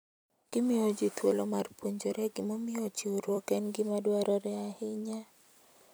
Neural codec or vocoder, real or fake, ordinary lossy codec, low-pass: vocoder, 44.1 kHz, 128 mel bands every 256 samples, BigVGAN v2; fake; none; none